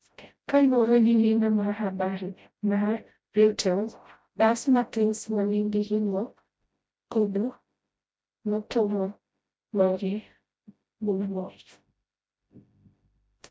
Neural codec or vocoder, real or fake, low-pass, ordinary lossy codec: codec, 16 kHz, 0.5 kbps, FreqCodec, smaller model; fake; none; none